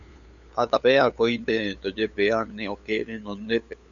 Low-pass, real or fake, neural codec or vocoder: 7.2 kHz; fake; codec, 16 kHz, 8 kbps, FunCodec, trained on LibriTTS, 25 frames a second